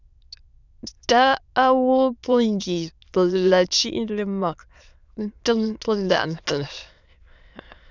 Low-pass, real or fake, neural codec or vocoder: 7.2 kHz; fake; autoencoder, 22.05 kHz, a latent of 192 numbers a frame, VITS, trained on many speakers